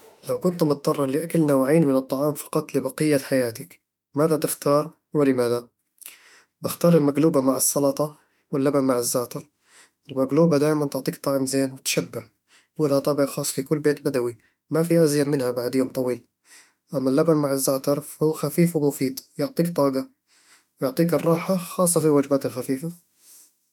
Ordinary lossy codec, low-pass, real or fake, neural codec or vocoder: none; 19.8 kHz; fake; autoencoder, 48 kHz, 32 numbers a frame, DAC-VAE, trained on Japanese speech